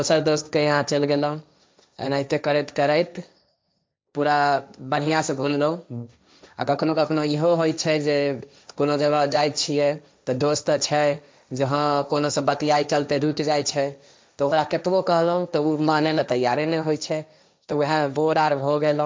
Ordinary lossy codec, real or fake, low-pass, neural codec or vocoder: none; fake; none; codec, 16 kHz, 1.1 kbps, Voila-Tokenizer